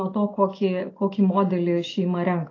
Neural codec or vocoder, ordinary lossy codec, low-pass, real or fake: none; AAC, 32 kbps; 7.2 kHz; real